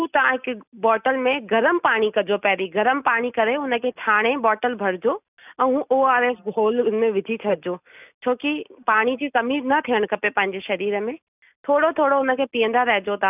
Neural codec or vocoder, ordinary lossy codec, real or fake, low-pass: none; none; real; 3.6 kHz